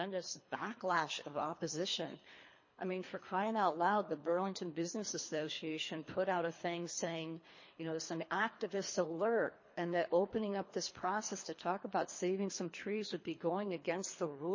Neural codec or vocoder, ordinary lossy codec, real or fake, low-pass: codec, 24 kHz, 3 kbps, HILCodec; MP3, 32 kbps; fake; 7.2 kHz